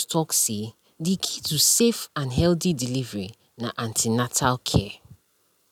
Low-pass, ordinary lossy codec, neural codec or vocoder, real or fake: 19.8 kHz; none; none; real